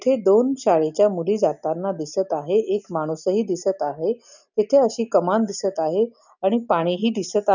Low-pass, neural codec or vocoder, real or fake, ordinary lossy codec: 7.2 kHz; none; real; none